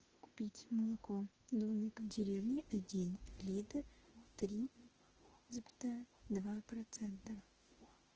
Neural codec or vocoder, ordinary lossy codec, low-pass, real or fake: autoencoder, 48 kHz, 32 numbers a frame, DAC-VAE, trained on Japanese speech; Opus, 16 kbps; 7.2 kHz; fake